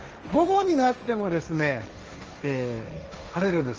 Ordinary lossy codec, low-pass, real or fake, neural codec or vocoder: Opus, 24 kbps; 7.2 kHz; fake; codec, 16 kHz, 1.1 kbps, Voila-Tokenizer